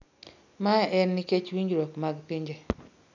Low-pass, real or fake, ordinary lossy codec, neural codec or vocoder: 7.2 kHz; real; none; none